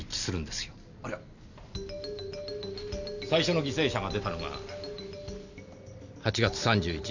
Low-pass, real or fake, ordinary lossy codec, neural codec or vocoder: 7.2 kHz; real; none; none